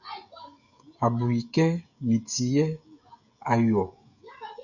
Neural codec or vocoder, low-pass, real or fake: codec, 16 kHz, 16 kbps, FreqCodec, smaller model; 7.2 kHz; fake